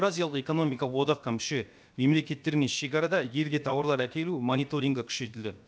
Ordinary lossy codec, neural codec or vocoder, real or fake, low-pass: none; codec, 16 kHz, 0.7 kbps, FocalCodec; fake; none